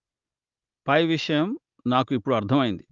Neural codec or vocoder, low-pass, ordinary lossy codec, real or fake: none; 7.2 kHz; Opus, 32 kbps; real